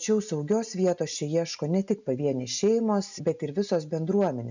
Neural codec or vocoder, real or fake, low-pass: none; real; 7.2 kHz